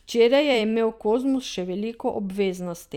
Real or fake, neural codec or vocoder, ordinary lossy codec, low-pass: fake; vocoder, 44.1 kHz, 128 mel bands every 256 samples, BigVGAN v2; none; 19.8 kHz